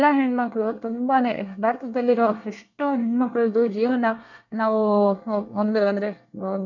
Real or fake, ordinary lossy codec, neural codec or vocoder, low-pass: fake; none; codec, 24 kHz, 1 kbps, SNAC; 7.2 kHz